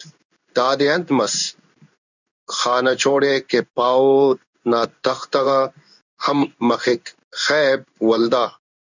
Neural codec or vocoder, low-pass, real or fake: codec, 16 kHz in and 24 kHz out, 1 kbps, XY-Tokenizer; 7.2 kHz; fake